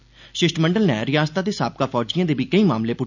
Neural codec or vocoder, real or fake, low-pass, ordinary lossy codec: none; real; 7.2 kHz; none